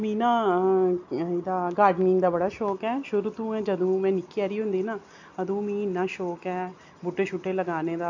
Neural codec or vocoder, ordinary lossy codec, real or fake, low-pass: none; MP3, 48 kbps; real; 7.2 kHz